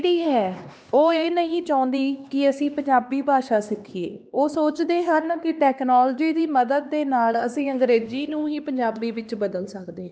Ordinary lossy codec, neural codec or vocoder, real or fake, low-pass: none; codec, 16 kHz, 2 kbps, X-Codec, HuBERT features, trained on LibriSpeech; fake; none